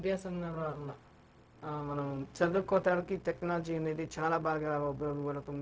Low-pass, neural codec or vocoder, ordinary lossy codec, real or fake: none; codec, 16 kHz, 0.4 kbps, LongCat-Audio-Codec; none; fake